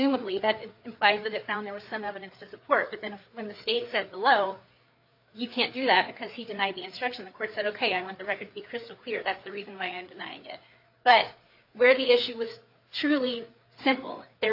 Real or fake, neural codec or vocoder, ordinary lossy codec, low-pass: fake; codec, 16 kHz, 4 kbps, FreqCodec, larger model; AAC, 48 kbps; 5.4 kHz